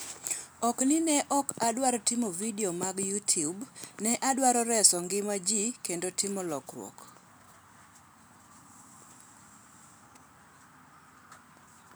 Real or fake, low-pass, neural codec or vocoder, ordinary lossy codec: real; none; none; none